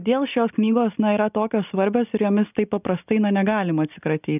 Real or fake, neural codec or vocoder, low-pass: real; none; 3.6 kHz